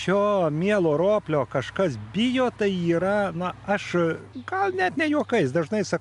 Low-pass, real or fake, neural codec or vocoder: 10.8 kHz; real; none